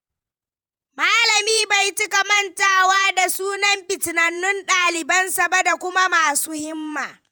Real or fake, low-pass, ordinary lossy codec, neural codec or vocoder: fake; none; none; vocoder, 48 kHz, 128 mel bands, Vocos